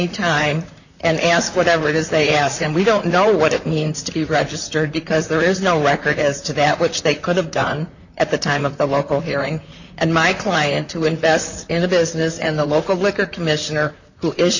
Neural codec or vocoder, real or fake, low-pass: vocoder, 44.1 kHz, 128 mel bands, Pupu-Vocoder; fake; 7.2 kHz